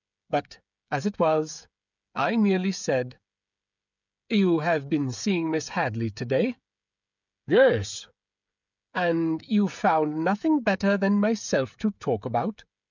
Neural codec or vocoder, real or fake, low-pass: codec, 16 kHz, 16 kbps, FreqCodec, smaller model; fake; 7.2 kHz